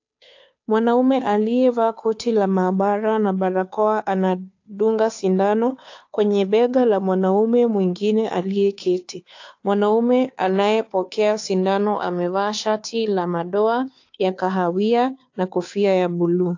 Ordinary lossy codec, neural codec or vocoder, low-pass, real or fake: AAC, 48 kbps; codec, 16 kHz, 2 kbps, FunCodec, trained on Chinese and English, 25 frames a second; 7.2 kHz; fake